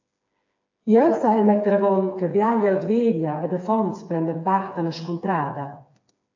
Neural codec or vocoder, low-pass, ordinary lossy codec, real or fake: codec, 16 kHz, 4 kbps, FreqCodec, smaller model; 7.2 kHz; AAC, 48 kbps; fake